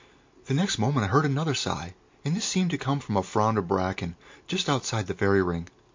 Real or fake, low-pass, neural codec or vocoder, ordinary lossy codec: real; 7.2 kHz; none; MP3, 48 kbps